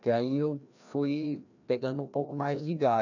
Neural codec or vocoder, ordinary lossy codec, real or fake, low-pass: codec, 16 kHz, 1 kbps, FreqCodec, larger model; none; fake; 7.2 kHz